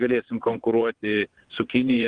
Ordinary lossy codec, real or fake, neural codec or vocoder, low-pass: Opus, 24 kbps; fake; vocoder, 22.05 kHz, 80 mel bands, WaveNeXt; 9.9 kHz